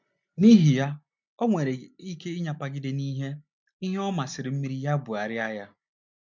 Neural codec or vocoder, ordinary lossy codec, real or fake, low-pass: none; MP3, 64 kbps; real; 7.2 kHz